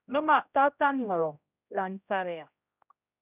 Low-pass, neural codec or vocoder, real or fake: 3.6 kHz; codec, 16 kHz, 0.5 kbps, X-Codec, HuBERT features, trained on general audio; fake